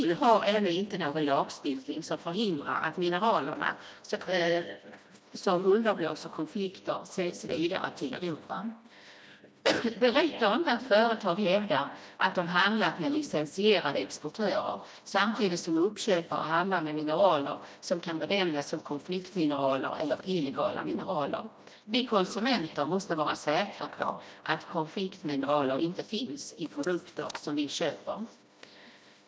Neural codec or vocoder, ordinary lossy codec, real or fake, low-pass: codec, 16 kHz, 1 kbps, FreqCodec, smaller model; none; fake; none